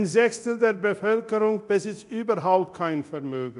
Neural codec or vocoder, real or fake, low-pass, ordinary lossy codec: codec, 24 kHz, 0.9 kbps, DualCodec; fake; 10.8 kHz; none